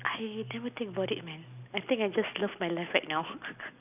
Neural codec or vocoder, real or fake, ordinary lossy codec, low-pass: none; real; none; 3.6 kHz